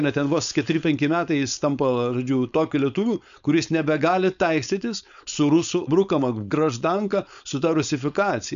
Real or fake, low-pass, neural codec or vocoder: fake; 7.2 kHz; codec, 16 kHz, 4.8 kbps, FACodec